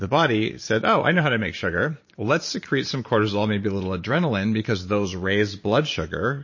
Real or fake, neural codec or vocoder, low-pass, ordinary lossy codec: fake; vocoder, 44.1 kHz, 128 mel bands every 512 samples, BigVGAN v2; 7.2 kHz; MP3, 32 kbps